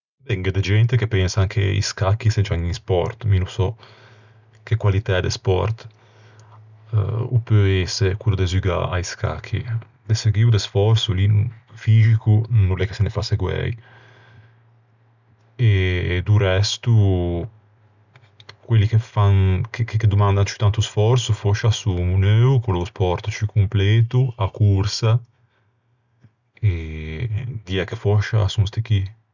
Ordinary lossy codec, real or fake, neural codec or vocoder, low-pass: none; real; none; 7.2 kHz